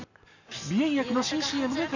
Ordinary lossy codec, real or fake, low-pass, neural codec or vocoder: none; real; 7.2 kHz; none